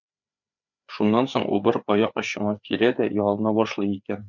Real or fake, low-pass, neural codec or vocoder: fake; 7.2 kHz; codec, 16 kHz, 4 kbps, FreqCodec, larger model